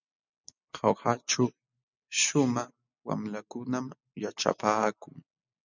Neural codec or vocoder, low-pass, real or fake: none; 7.2 kHz; real